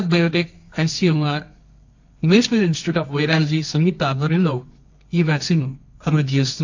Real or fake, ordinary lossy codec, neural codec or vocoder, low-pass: fake; AAC, 48 kbps; codec, 24 kHz, 0.9 kbps, WavTokenizer, medium music audio release; 7.2 kHz